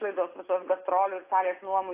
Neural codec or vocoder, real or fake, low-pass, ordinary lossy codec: none; real; 3.6 kHz; AAC, 24 kbps